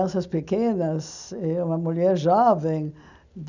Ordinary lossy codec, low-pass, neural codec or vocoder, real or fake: none; 7.2 kHz; none; real